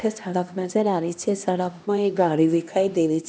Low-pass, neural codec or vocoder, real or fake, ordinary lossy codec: none; codec, 16 kHz, 1 kbps, X-Codec, HuBERT features, trained on LibriSpeech; fake; none